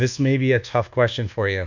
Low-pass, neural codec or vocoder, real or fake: 7.2 kHz; codec, 24 kHz, 1.2 kbps, DualCodec; fake